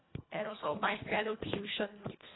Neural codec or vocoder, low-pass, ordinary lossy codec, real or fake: codec, 24 kHz, 1.5 kbps, HILCodec; 7.2 kHz; AAC, 16 kbps; fake